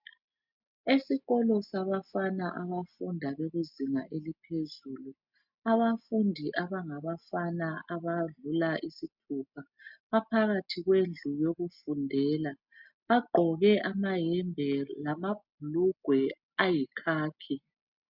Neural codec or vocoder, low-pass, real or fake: none; 5.4 kHz; real